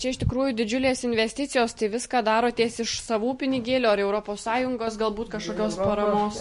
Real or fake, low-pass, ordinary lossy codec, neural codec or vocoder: real; 14.4 kHz; MP3, 48 kbps; none